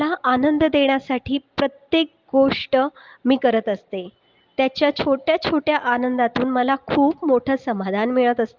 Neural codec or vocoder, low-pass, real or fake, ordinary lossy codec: none; 7.2 kHz; real; Opus, 32 kbps